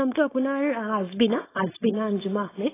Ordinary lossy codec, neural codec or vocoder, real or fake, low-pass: AAC, 16 kbps; codec, 16 kHz, 4.8 kbps, FACodec; fake; 3.6 kHz